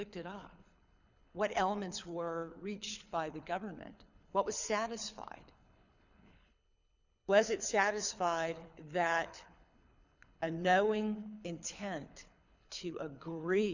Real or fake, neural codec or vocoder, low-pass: fake; codec, 24 kHz, 6 kbps, HILCodec; 7.2 kHz